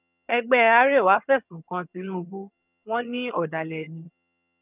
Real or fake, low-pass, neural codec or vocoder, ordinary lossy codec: fake; 3.6 kHz; vocoder, 22.05 kHz, 80 mel bands, HiFi-GAN; none